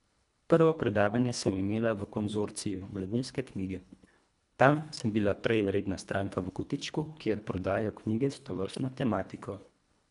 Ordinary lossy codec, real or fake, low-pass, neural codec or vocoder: none; fake; 10.8 kHz; codec, 24 kHz, 1.5 kbps, HILCodec